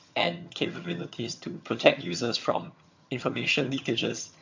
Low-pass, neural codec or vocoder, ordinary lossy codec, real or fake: 7.2 kHz; vocoder, 22.05 kHz, 80 mel bands, HiFi-GAN; MP3, 48 kbps; fake